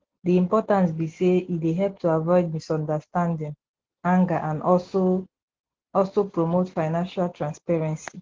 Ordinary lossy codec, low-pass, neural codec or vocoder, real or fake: Opus, 16 kbps; 7.2 kHz; none; real